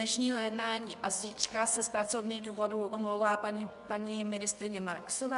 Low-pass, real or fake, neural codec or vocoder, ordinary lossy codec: 10.8 kHz; fake; codec, 24 kHz, 0.9 kbps, WavTokenizer, medium music audio release; AAC, 96 kbps